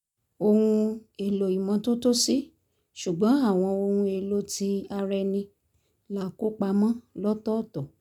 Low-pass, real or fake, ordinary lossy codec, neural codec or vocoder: 19.8 kHz; real; none; none